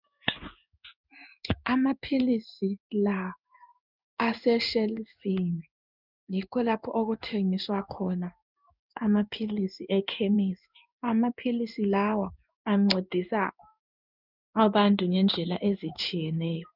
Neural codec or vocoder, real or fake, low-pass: codec, 16 kHz in and 24 kHz out, 1 kbps, XY-Tokenizer; fake; 5.4 kHz